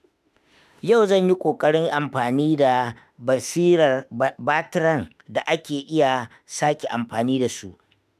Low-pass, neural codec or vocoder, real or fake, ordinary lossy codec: 14.4 kHz; autoencoder, 48 kHz, 32 numbers a frame, DAC-VAE, trained on Japanese speech; fake; none